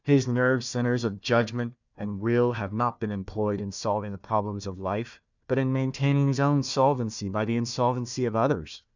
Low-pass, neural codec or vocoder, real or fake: 7.2 kHz; codec, 16 kHz, 1 kbps, FunCodec, trained on Chinese and English, 50 frames a second; fake